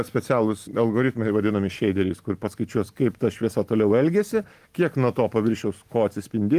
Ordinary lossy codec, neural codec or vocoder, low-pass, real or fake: Opus, 32 kbps; none; 14.4 kHz; real